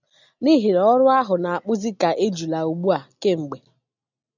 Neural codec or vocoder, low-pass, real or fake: none; 7.2 kHz; real